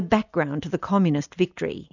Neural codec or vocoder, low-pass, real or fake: none; 7.2 kHz; real